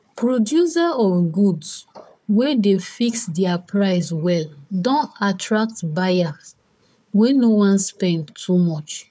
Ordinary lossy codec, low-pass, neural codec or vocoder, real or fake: none; none; codec, 16 kHz, 4 kbps, FunCodec, trained on Chinese and English, 50 frames a second; fake